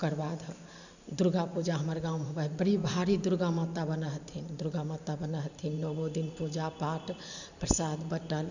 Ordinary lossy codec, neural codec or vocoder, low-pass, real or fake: none; vocoder, 44.1 kHz, 128 mel bands every 512 samples, BigVGAN v2; 7.2 kHz; fake